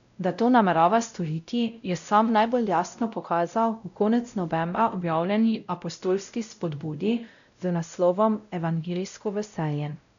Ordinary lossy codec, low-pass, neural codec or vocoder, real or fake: none; 7.2 kHz; codec, 16 kHz, 0.5 kbps, X-Codec, WavLM features, trained on Multilingual LibriSpeech; fake